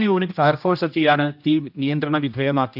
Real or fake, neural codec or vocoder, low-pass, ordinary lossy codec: fake; codec, 16 kHz, 1 kbps, X-Codec, HuBERT features, trained on general audio; 5.4 kHz; none